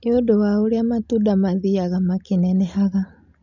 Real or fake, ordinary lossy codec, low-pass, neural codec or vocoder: real; none; 7.2 kHz; none